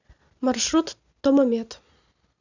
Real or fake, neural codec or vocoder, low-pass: real; none; 7.2 kHz